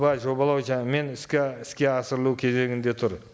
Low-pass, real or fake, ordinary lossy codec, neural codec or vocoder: none; real; none; none